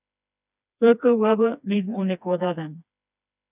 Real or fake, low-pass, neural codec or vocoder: fake; 3.6 kHz; codec, 16 kHz, 2 kbps, FreqCodec, smaller model